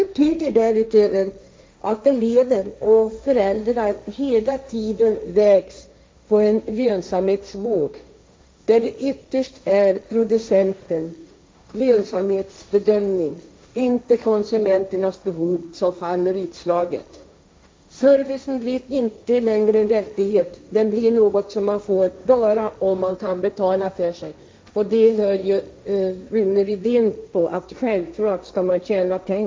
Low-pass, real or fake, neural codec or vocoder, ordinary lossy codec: none; fake; codec, 16 kHz, 1.1 kbps, Voila-Tokenizer; none